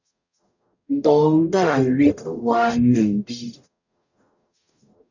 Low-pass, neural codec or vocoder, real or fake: 7.2 kHz; codec, 44.1 kHz, 0.9 kbps, DAC; fake